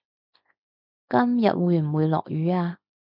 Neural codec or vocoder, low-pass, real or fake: none; 5.4 kHz; real